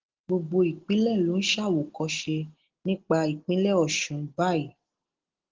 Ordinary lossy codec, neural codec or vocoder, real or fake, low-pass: Opus, 16 kbps; none; real; 7.2 kHz